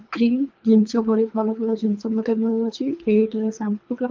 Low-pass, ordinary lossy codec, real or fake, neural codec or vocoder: 7.2 kHz; Opus, 24 kbps; fake; codec, 24 kHz, 3 kbps, HILCodec